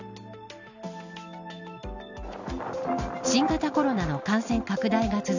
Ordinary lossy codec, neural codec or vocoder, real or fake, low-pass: none; none; real; 7.2 kHz